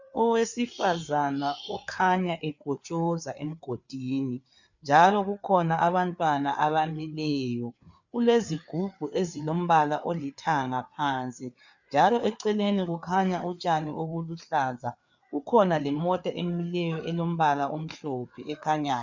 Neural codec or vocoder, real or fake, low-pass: codec, 16 kHz, 4 kbps, FreqCodec, larger model; fake; 7.2 kHz